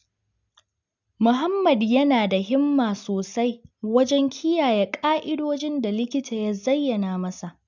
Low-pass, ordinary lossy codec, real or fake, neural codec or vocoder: 7.2 kHz; none; real; none